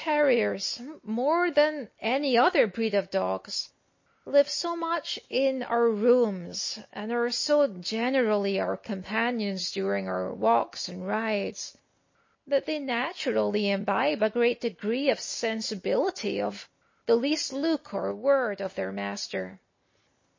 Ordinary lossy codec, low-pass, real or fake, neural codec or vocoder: MP3, 32 kbps; 7.2 kHz; real; none